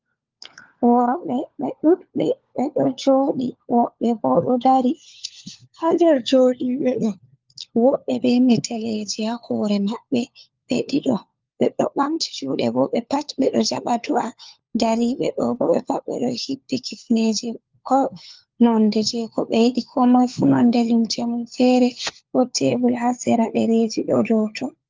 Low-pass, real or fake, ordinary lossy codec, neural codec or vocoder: 7.2 kHz; fake; Opus, 24 kbps; codec, 16 kHz, 4 kbps, FunCodec, trained on LibriTTS, 50 frames a second